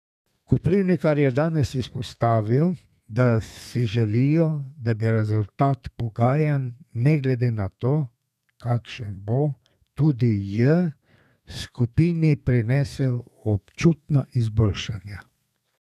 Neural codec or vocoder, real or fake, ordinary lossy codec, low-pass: codec, 32 kHz, 1.9 kbps, SNAC; fake; none; 14.4 kHz